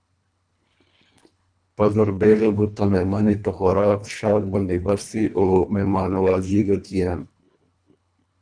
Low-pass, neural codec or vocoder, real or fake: 9.9 kHz; codec, 24 kHz, 1.5 kbps, HILCodec; fake